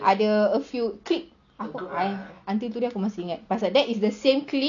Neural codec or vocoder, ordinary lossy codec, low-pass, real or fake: none; none; 7.2 kHz; real